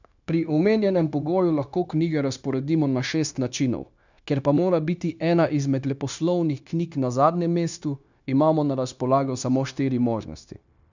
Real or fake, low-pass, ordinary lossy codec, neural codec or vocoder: fake; 7.2 kHz; none; codec, 16 kHz, 0.9 kbps, LongCat-Audio-Codec